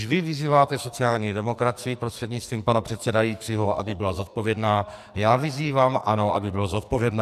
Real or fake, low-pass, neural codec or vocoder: fake; 14.4 kHz; codec, 44.1 kHz, 2.6 kbps, SNAC